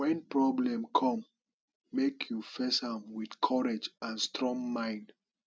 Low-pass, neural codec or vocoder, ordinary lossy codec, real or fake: none; none; none; real